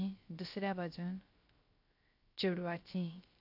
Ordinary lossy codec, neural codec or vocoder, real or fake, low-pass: AAC, 32 kbps; codec, 16 kHz, 0.3 kbps, FocalCodec; fake; 5.4 kHz